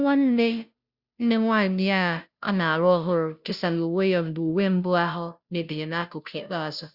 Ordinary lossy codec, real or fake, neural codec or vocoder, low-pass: Opus, 64 kbps; fake; codec, 16 kHz, 0.5 kbps, FunCodec, trained on Chinese and English, 25 frames a second; 5.4 kHz